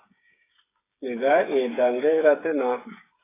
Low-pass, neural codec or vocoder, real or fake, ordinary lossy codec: 3.6 kHz; codec, 16 kHz, 8 kbps, FreqCodec, smaller model; fake; AAC, 16 kbps